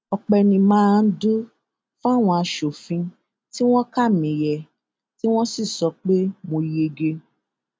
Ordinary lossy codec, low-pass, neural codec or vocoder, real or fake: none; none; none; real